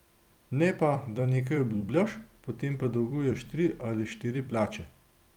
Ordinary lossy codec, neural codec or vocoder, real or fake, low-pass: Opus, 32 kbps; vocoder, 44.1 kHz, 128 mel bands every 256 samples, BigVGAN v2; fake; 19.8 kHz